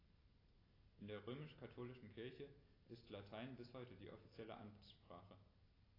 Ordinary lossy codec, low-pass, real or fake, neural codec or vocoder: AAC, 24 kbps; 5.4 kHz; real; none